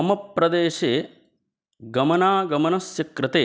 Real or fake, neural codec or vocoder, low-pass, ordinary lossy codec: real; none; none; none